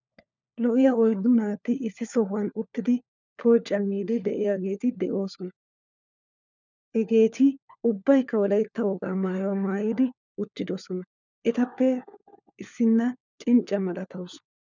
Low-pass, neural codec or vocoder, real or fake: 7.2 kHz; codec, 16 kHz, 4 kbps, FunCodec, trained on LibriTTS, 50 frames a second; fake